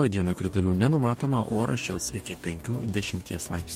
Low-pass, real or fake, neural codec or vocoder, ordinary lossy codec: 19.8 kHz; fake; codec, 44.1 kHz, 2.6 kbps, DAC; MP3, 64 kbps